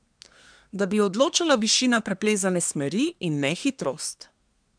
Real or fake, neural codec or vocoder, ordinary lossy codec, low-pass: fake; codec, 24 kHz, 1 kbps, SNAC; none; 9.9 kHz